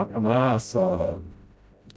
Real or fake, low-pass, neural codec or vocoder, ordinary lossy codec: fake; none; codec, 16 kHz, 0.5 kbps, FreqCodec, smaller model; none